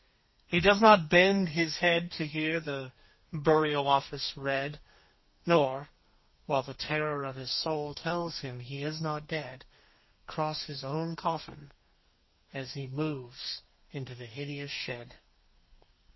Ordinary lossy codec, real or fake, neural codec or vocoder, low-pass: MP3, 24 kbps; fake; codec, 32 kHz, 1.9 kbps, SNAC; 7.2 kHz